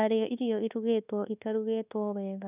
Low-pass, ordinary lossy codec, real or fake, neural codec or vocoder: 3.6 kHz; none; fake; codec, 24 kHz, 1.2 kbps, DualCodec